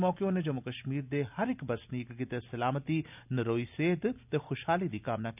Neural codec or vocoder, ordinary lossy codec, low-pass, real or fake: none; none; 3.6 kHz; real